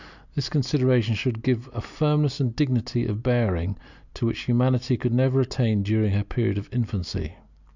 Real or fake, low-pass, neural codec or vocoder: real; 7.2 kHz; none